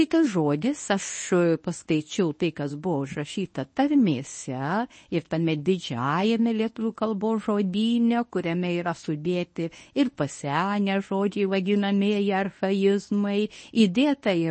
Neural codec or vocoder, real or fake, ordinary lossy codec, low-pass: codec, 24 kHz, 0.9 kbps, WavTokenizer, medium speech release version 1; fake; MP3, 32 kbps; 9.9 kHz